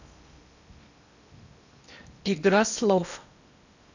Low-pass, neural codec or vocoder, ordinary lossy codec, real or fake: 7.2 kHz; codec, 16 kHz in and 24 kHz out, 0.8 kbps, FocalCodec, streaming, 65536 codes; none; fake